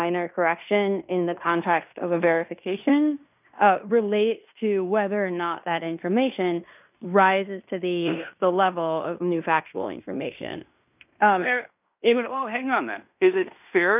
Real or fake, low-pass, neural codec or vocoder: fake; 3.6 kHz; codec, 16 kHz in and 24 kHz out, 0.9 kbps, LongCat-Audio-Codec, fine tuned four codebook decoder